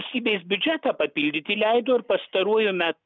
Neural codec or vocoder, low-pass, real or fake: none; 7.2 kHz; real